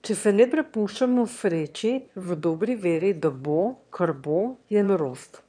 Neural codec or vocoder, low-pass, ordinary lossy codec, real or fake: autoencoder, 22.05 kHz, a latent of 192 numbers a frame, VITS, trained on one speaker; 9.9 kHz; none; fake